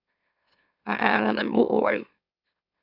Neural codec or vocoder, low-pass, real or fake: autoencoder, 44.1 kHz, a latent of 192 numbers a frame, MeloTTS; 5.4 kHz; fake